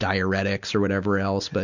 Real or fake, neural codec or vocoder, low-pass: real; none; 7.2 kHz